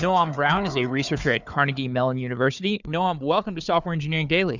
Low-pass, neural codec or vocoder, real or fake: 7.2 kHz; codec, 16 kHz, 4 kbps, FunCodec, trained on Chinese and English, 50 frames a second; fake